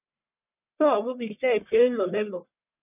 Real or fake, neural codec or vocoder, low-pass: fake; codec, 44.1 kHz, 1.7 kbps, Pupu-Codec; 3.6 kHz